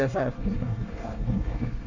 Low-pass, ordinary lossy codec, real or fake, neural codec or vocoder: 7.2 kHz; none; fake; codec, 24 kHz, 1 kbps, SNAC